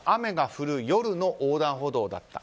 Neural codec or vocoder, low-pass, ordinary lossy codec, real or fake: none; none; none; real